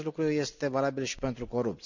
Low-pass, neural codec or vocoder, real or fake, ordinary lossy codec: 7.2 kHz; none; real; none